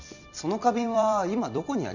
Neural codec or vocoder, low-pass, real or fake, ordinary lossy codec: vocoder, 44.1 kHz, 128 mel bands every 256 samples, BigVGAN v2; 7.2 kHz; fake; none